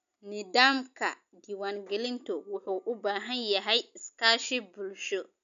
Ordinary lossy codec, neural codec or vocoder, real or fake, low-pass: none; none; real; 7.2 kHz